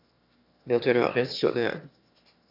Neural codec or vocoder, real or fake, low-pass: autoencoder, 22.05 kHz, a latent of 192 numbers a frame, VITS, trained on one speaker; fake; 5.4 kHz